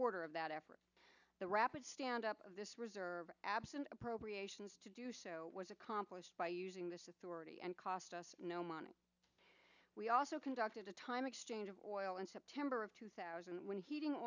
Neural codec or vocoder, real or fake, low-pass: none; real; 7.2 kHz